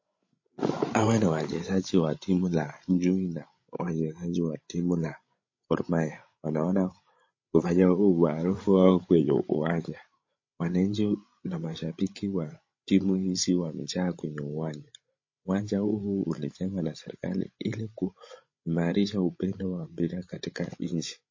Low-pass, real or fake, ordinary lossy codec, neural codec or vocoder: 7.2 kHz; fake; MP3, 32 kbps; codec, 16 kHz, 16 kbps, FreqCodec, larger model